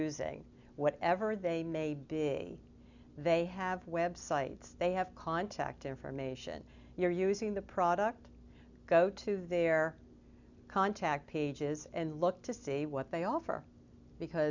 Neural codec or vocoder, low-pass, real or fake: none; 7.2 kHz; real